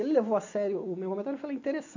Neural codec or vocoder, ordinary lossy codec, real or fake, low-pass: none; AAC, 48 kbps; real; 7.2 kHz